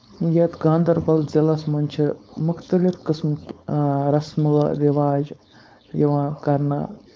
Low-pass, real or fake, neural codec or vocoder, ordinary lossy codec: none; fake; codec, 16 kHz, 4.8 kbps, FACodec; none